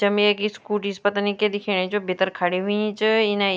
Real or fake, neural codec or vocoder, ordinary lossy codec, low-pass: real; none; none; none